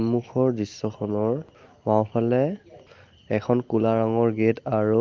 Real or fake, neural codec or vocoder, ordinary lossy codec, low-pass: real; none; Opus, 32 kbps; 7.2 kHz